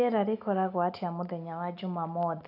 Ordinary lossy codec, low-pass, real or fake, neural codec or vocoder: none; 5.4 kHz; real; none